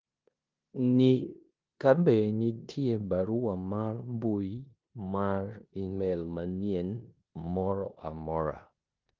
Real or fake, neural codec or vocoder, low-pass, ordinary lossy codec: fake; codec, 16 kHz in and 24 kHz out, 0.9 kbps, LongCat-Audio-Codec, four codebook decoder; 7.2 kHz; Opus, 32 kbps